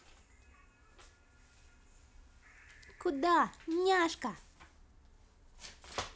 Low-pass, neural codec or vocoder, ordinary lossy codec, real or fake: none; none; none; real